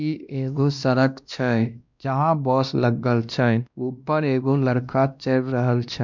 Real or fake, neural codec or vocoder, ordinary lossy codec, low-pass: fake; codec, 16 kHz, 1 kbps, X-Codec, WavLM features, trained on Multilingual LibriSpeech; none; 7.2 kHz